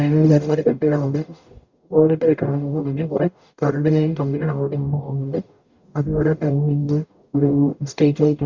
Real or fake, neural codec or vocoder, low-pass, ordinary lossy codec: fake; codec, 44.1 kHz, 0.9 kbps, DAC; 7.2 kHz; Opus, 64 kbps